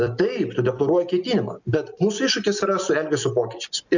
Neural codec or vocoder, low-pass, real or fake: none; 7.2 kHz; real